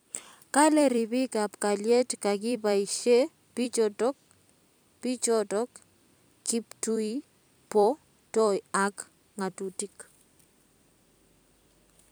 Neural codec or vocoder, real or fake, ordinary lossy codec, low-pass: vocoder, 44.1 kHz, 128 mel bands every 256 samples, BigVGAN v2; fake; none; none